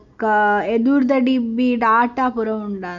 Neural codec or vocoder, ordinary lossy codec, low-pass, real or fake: none; none; 7.2 kHz; real